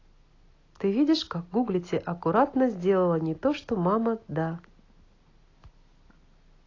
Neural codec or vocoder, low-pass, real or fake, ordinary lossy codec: none; 7.2 kHz; real; AAC, 32 kbps